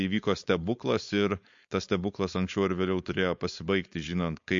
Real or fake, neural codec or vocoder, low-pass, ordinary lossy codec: fake; codec, 16 kHz, 4.8 kbps, FACodec; 7.2 kHz; MP3, 48 kbps